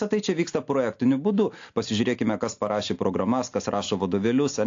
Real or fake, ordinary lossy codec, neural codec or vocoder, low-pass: real; AAC, 48 kbps; none; 7.2 kHz